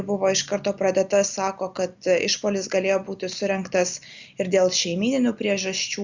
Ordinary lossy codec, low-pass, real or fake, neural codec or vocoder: Opus, 64 kbps; 7.2 kHz; real; none